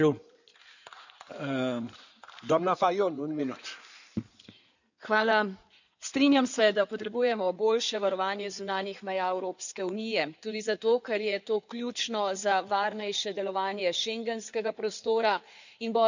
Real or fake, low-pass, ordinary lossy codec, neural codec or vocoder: fake; 7.2 kHz; none; codec, 16 kHz in and 24 kHz out, 2.2 kbps, FireRedTTS-2 codec